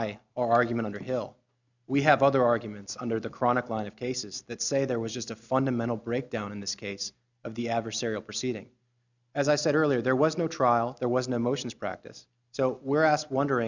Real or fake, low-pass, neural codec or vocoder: real; 7.2 kHz; none